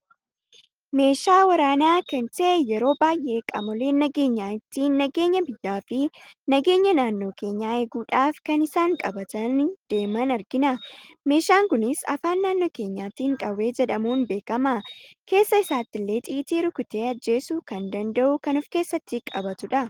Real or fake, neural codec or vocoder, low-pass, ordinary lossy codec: real; none; 19.8 kHz; Opus, 24 kbps